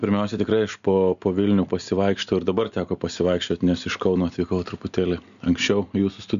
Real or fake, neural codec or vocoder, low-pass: real; none; 7.2 kHz